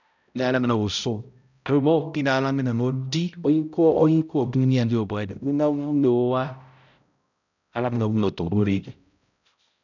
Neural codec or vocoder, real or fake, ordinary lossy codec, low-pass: codec, 16 kHz, 0.5 kbps, X-Codec, HuBERT features, trained on balanced general audio; fake; none; 7.2 kHz